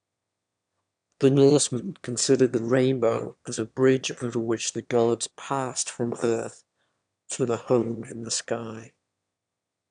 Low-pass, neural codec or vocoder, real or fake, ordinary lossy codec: 9.9 kHz; autoencoder, 22.05 kHz, a latent of 192 numbers a frame, VITS, trained on one speaker; fake; none